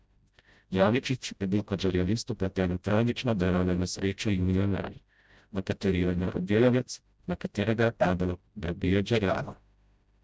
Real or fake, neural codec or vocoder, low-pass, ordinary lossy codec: fake; codec, 16 kHz, 0.5 kbps, FreqCodec, smaller model; none; none